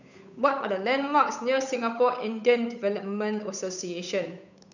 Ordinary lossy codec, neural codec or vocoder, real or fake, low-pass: none; codec, 16 kHz, 8 kbps, FunCodec, trained on Chinese and English, 25 frames a second; fake; 7.2 kHz